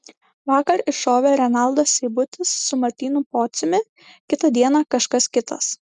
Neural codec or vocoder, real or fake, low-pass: none; real; 10.8 kHz